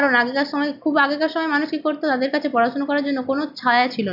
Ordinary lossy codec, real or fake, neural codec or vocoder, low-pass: AAC, 48 kbps; real; none; 5.4 kHz